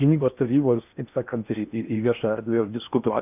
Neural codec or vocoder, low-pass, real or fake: codec, 16 kHz in and 24 kHz out, 0.8 kbps, FocalCodec, streaming, 65536 codes; 3.6 kHz; fake